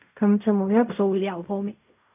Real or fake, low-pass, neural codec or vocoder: fake; 3.6 kHz; codec, 16 kHz in and 24 kHz out, 0.4 kbps, LongCat-Audio-Codec, fine tuned four codebook decoder